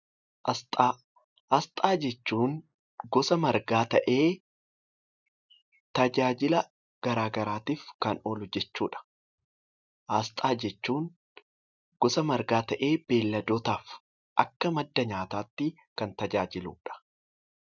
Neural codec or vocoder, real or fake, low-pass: none; real; 7.2 kHz